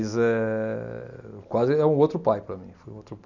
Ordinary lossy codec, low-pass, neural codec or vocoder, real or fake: none; 7.2 kHz; none; real